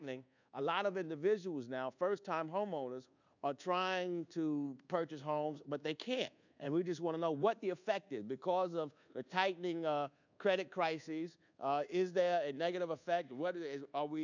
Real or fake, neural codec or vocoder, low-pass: fake; codec, 24 kHz, 1.2 kbps, DualCodec; 7.2 kHz